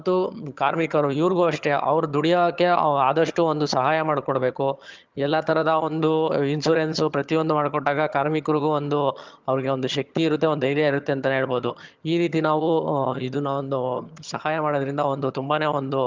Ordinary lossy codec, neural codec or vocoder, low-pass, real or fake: Opus, 24 kbps; vocoder, 22.05 kHz, 80 mel bands, HiFi-GAN; 7.2 kHz; fake